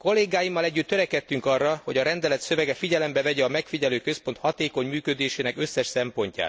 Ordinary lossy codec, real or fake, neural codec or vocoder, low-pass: none; real; none; none